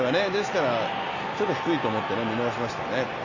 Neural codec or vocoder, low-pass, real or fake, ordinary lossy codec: none; 7.2 kHz; real; none